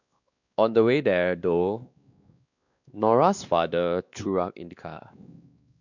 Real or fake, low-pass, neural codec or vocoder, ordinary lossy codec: fake; 7.2 kHz; codec, 16 kHz, 2 kbps, X-Codec, WavLM features, trained on Multilingual LibriSpeech; none